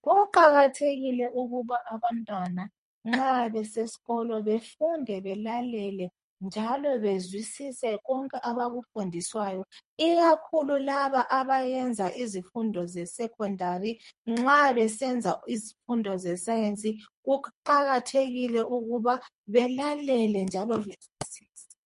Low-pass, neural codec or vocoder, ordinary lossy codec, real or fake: 10.8 kHz; codec, 24 kHz, 3 kbps, HILCodec; MP3, 48 kbps; fake